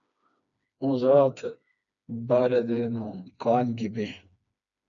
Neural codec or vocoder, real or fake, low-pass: codec, 16 kHz, 2 kbps, FreqCodec, smaller model; fake; 7.2 kHz